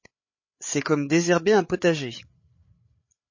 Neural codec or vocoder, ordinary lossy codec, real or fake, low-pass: codec, 16 kHz, 8 kbps, FreqCodec, larger model; MP3, 32 kbps; fake; 7.2 kHz